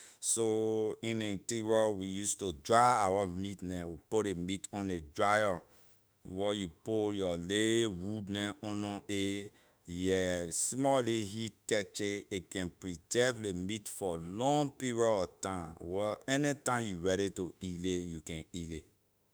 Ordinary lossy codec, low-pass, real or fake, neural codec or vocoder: none; none; fake; autoencoder, 48 kHz, 32 numbers a frame, DAC-VAE, trained on Japanese speech